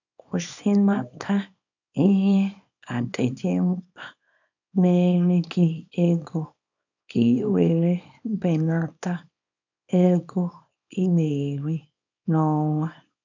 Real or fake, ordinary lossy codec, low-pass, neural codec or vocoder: fake; none; 7.2 kHz; codec, 24 kHz, 0.9 kbps, WavTokenizer, small release